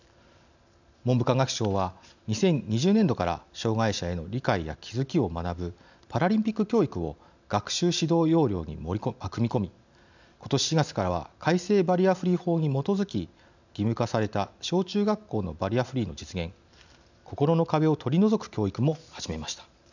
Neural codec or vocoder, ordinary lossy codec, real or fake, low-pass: none; none; real; 7.2 kHz